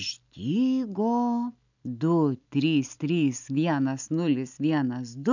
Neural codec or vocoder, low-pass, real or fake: none; 7.2 kHz; real